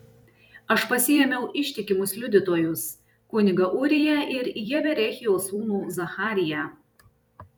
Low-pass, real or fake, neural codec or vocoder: 19.8 kHz; fake; vocoder, 44.1 kHz, 128 mel bands every 256 samples, BigVGAN v2